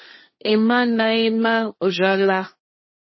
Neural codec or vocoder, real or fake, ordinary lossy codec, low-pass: codec, 16 kHz, 1.1 kbps, Voila-Tokenizer; fake; MP3, 24 kbps; 7.2 kHz